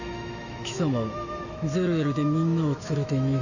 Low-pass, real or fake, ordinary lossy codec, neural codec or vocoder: 7.2 kHz; fake; none; autoencoder, 48 kHz, 128 numbers a frame, DAC-VAE, trained on Japanese speech